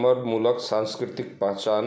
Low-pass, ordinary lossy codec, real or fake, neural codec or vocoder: none; none; real; none